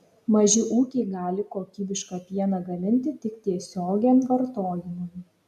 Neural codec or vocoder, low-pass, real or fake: none; 14.4 kHz; real